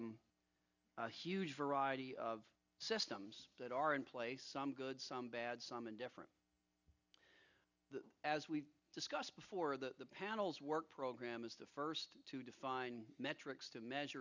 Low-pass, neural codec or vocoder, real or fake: 7.2 kHz; none; real